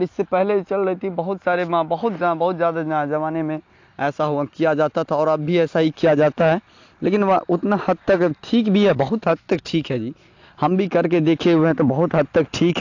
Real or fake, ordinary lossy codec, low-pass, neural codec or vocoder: real; AAC, 48 kbps; 7.2 kHz; none